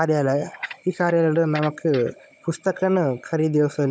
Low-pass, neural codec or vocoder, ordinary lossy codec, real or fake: none; codec, 16 kHz, 16 kbps, FunCodec, trained on Chinese and English, 50 frames a second; none; fake